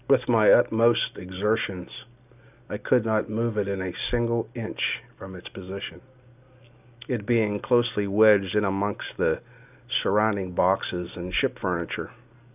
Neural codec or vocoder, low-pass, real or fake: none; 3.6 kHz; real